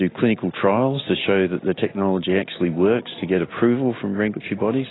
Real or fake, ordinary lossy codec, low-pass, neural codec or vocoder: real; AAC, 16 kbps; 7.2 kHz; none